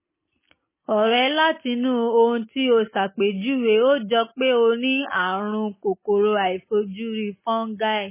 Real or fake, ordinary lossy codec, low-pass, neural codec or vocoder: real; MP3, 16 kbps; 3.6 kHz; none